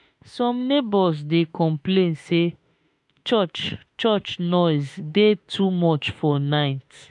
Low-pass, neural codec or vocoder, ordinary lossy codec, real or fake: 10.8 kHz; autoencoder, 48 kHz, 32 numbers a frame, DAC-VAE, trained on Japanese speech; MP3, 96 kbps; fake